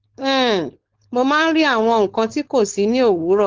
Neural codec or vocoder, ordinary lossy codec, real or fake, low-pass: codec, 16 kHz, 4.8 kbps, FACodec; Opus, 16 kbps; fake; 7.2 kHz